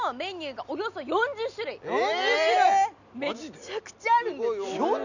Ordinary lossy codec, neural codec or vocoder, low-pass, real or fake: none; none; 7.2 kHz; real